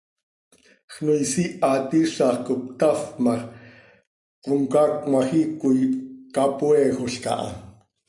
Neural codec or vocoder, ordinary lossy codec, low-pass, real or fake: none; MP3, 64 kbps; 10.8 kHz; real